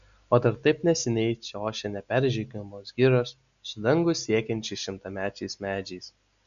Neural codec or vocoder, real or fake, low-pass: none; real; 7.2 kHz